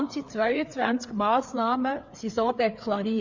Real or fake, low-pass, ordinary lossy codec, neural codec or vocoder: fake; 7.2 kHz; MP3, 48 kbps; codec, 16 kHz, 4 kbps, FreqCodec, larger model